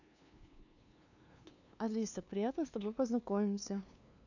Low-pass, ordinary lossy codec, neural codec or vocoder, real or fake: 7.2 kHz; none; codec, 16 kHz, 2 kbps, FunCodec, trained on LibriTTS, 25 frames a second; fake